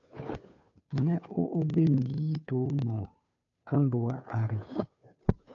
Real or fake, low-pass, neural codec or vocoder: fake; 7.2 kHz; codec, 16 kHz, 2 kbps, FunCodec, trained on Chinese and English, 25 frames a second